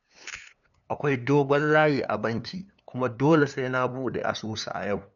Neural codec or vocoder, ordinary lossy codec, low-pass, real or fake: codec, 16 kHz, 2 kbps, FunCodec, trained on LibriTTS, 25 frames a second; none; 7.2 kHz; fake